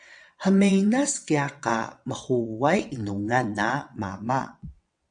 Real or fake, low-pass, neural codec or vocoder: fake; 9.9 kHz; vocoder, 22.05 kHz, 80 mel bands, WaveNeXt